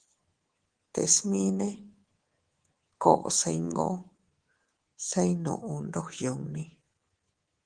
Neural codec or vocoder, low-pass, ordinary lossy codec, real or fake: codec, 24 kHz, 3.1 kbps, DualCodec; 9.9 kHz; Opus, 16 kbps; fake